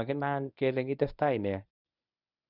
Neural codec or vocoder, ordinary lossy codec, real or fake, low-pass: codec, 24 kHz, 0.9 kbps, WavTokenizer, medium speech release version 1; none; fake; 5.4 kHz